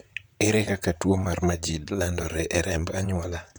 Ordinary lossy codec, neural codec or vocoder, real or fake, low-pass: none; vocoder, 44.1 kHz, 128 mel bands, Pupu-Vocoder; fake; none